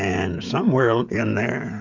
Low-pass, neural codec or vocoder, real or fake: 7.2 kHz; codec, 16 kHz, 16 kbps, FreqCodec, smaller model; fake